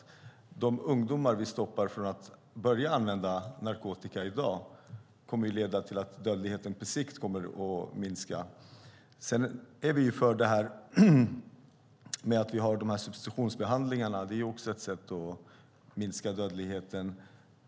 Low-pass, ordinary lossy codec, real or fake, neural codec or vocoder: none; none; real; none